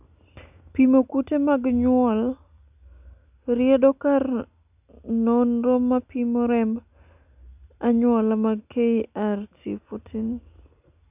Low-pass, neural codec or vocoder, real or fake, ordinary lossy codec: 3.6 kHz; none; real; none